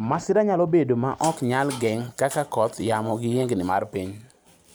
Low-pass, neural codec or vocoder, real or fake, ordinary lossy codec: none; none; real; none